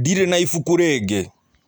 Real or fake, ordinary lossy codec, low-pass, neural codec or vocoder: real; none; none; none